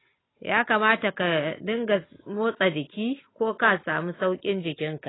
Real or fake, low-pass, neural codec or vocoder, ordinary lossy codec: real; 7.2 kHz; none; AAC, 16 kbps